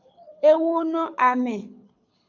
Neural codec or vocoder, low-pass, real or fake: codec, 24 kHz, 6 kbps, HILCodec; 7.2 kHz; fake